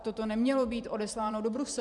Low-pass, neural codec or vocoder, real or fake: 10.8 kHz; vocoder, 44.1 kHz, 128 mel bands every 512 samples, BigVGAN v2; fake